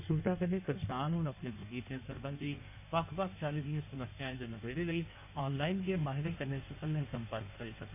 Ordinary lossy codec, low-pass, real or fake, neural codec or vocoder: none; 3.6 kHz; fake; codec, 16 kHz in and 24 kHz out, 1.1 kbps, FireRedTTS-2 codec